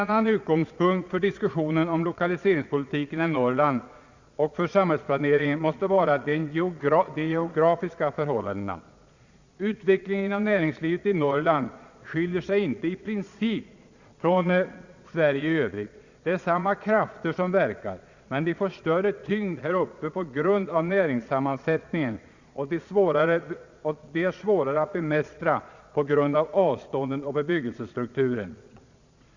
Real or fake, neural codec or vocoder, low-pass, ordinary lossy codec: fake; vocoder, 22.05 kHz, 80 mel bands, Vocos; 7.2 kHz; Opus, 64 kbps